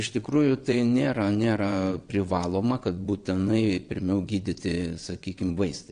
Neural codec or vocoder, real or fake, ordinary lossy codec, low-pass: vocoder, 22.05 kHz, 80 mel bands, WaveNeXt; fake; AAC, 64 kbps; 9.9 kHz